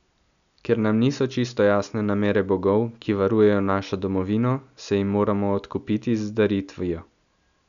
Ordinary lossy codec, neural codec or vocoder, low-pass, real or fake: none; none; 7.2 kHz; real